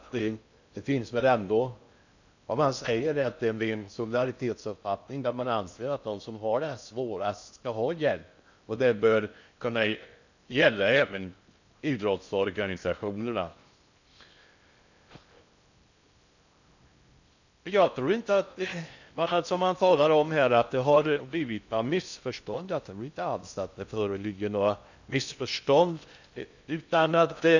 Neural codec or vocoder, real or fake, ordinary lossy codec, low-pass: codec, 16 kHz in and 24 kHz out, 0.6 kbps, FocalCodec, streaming, 2048 codes; fake; none; 7.2 kHz